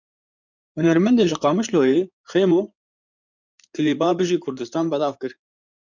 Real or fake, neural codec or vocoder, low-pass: fake; codec, 16 kHz in and 24 kHz out, 2.2 kbps, FireRedTTS-2 codec; 7.2 kHz